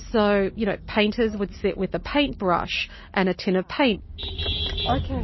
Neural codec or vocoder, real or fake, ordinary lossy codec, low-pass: none; real; MP3, 24 kbps; 7.2 kHz